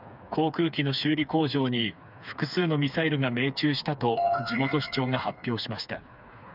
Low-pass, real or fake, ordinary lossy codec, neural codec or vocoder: 5.4 kHz; fake; none; codec, 16 kHz, 4 kbps, FreqCodec, smaller model